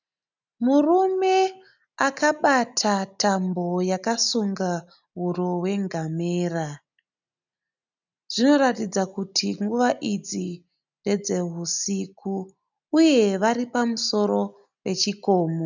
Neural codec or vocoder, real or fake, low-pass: none; real; 7.2 kHz